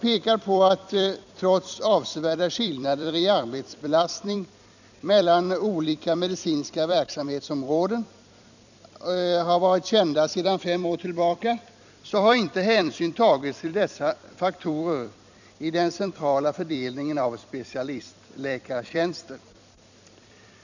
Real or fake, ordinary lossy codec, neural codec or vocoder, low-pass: fake; none; vocoder, 44.1 kHz, 128 mel bands every 256 samples, BigVGAN v2; 7.2 kHz